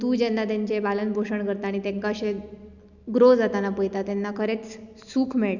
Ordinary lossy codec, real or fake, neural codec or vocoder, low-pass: none; real; none; 7.2 kHz